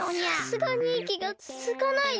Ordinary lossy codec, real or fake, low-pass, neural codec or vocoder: none; real; none; none